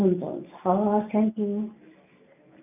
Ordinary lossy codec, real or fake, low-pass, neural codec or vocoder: none; real; 3.6 kHz; none